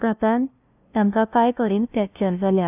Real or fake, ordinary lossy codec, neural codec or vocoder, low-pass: fake; none; codec, 16 kHz, 0.5 kbps, FunCodec, trained on LibriTTS, 25 frames a second; 3.6 kHz